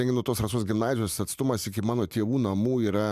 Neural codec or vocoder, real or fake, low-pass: vocoder, 44.1 kHz, 128 mel bands every 512 samples, BigVGAN v2; fake; 14.4 kHz